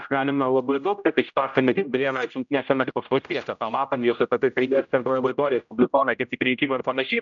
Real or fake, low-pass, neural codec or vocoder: fake; 7.2 kHz; codec, 16 kHz, 0.5 kbps, X-Codec, HuBERT features, trained on general audio